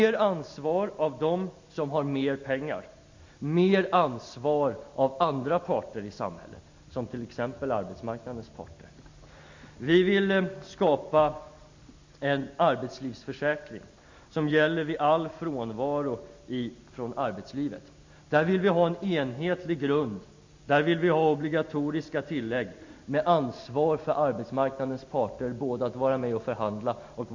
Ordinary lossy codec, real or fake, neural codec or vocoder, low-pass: MP3, 64 kbps; real; none; 7.2 kHz